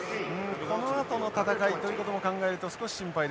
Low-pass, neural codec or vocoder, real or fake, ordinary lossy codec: none; none; real; none